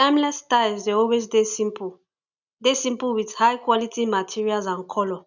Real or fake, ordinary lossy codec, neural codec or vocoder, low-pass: real; none; none; 7.2 kHz